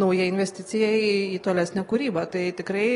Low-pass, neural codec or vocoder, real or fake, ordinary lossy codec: 10.8 kHz; none; real; AAC, 32 kbps